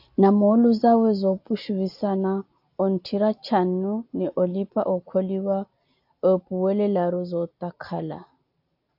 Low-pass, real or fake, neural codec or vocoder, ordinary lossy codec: 5.4 kHz; real; none; AAC, 48 kbps